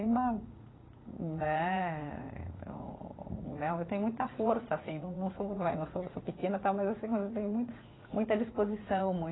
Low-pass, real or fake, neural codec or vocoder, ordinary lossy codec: 7.2 kHz; fake; vocoder, 44.1 kHz, 80 mel bands, Vocos; AAC, 16 kbps